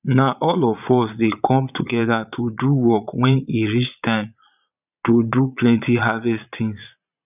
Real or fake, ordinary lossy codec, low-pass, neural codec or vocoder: fake; none; 3.6 kHz; vocoder, 22.05 kHz, 80 mel bands, Vocos